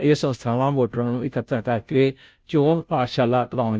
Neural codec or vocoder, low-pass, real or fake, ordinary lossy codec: codec, 16 kHz, 0.5 kbps, FunCodec, trained on Chinese and English, 25 frames a second; none; fake; none